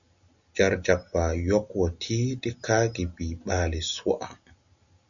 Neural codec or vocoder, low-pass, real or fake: none; 7.2 kHz; real